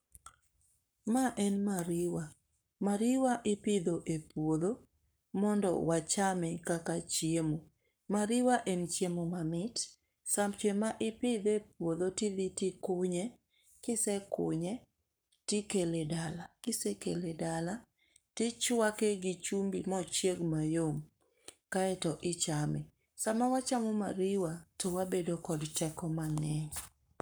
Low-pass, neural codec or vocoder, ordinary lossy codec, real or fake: none; codec, 44.1 kHz, 7.8 kbps, Pupu-Codec; none; fake